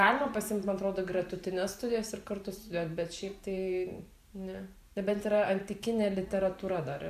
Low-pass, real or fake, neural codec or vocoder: 14.4 kHz; fake; vocoder, 44.1 kHz, 128 mel bands every 512 samples, BigVGAN v2